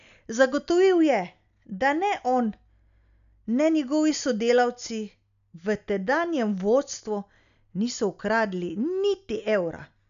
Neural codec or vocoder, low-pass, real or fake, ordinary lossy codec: none; 7.2 kHz; real; none